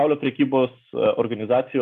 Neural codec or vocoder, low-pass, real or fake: none; 14.4 kHz; real